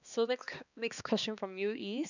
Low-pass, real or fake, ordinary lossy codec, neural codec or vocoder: 7.2 kHz; fake; none; codec, 16 kHz, 2 kbps, X-Codec, HuBERT features, trained on balanced general audio